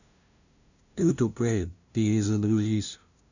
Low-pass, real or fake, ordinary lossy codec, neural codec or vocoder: 7.2 kHz; fake; none; codec, 16 kHz, 0.5 kbps, FunCodec, trained on LibriTTS, 25 frames a second